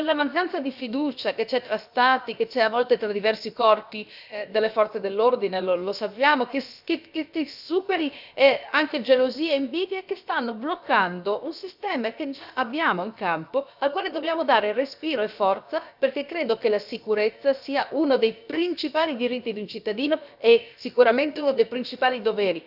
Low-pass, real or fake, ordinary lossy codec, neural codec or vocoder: 5.4 kHz; fake; none; codec, 16 kHz, about 1 kbps, DyCAST, with the encoder's durations